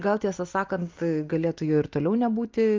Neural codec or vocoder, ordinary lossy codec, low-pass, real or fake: none; Opus, 32 kbps; 7.2 kHz; real